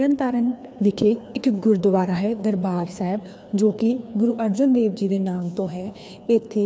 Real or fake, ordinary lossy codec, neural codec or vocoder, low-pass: fake; none; codec, 16 kHz, 2 kbps, FreqCodec, larger model; none